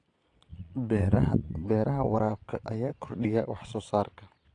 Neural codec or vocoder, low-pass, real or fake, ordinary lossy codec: vocoder, 22.05 kHz, 80 mel bands, Vocos; 9.9 kHz; fake; AAC, 48 kbps